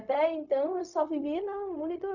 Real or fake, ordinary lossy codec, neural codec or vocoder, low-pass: fake; none; codec, 16 kHz, 0.4 kbps, LongCat-Audio-Codec; 7.2 kHz